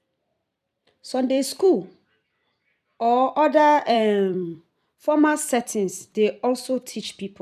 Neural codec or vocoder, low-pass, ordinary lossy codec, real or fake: vocoder, 44.1 kHz, 128 mel bands every 256 samples, BigVGAN v2; 14.4 kHz; none; fake